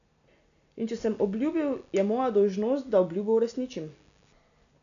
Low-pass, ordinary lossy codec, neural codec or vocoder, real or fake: 7.2 kHz; none; none; real